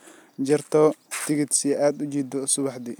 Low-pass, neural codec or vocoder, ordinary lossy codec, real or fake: none; none; none; real